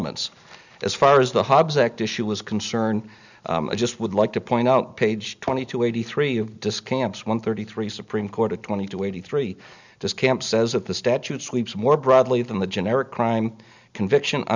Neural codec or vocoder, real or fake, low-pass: none; real; 7.2 kHz